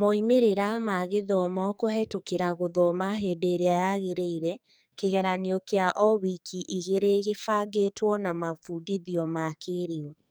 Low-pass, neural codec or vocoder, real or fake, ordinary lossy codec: none; codec, 44.1 kHz, 2.6 kbps, SNAC; fake; none